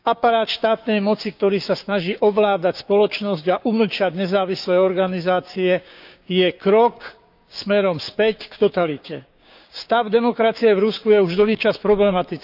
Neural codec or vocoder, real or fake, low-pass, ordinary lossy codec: codec, 16 kHz, 6 kbps, DAC; fake; 5.4 kHz; none